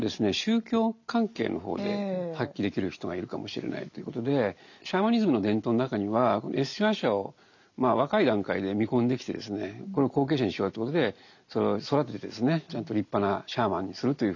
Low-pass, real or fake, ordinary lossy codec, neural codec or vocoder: 7.2 kHz; real; none; none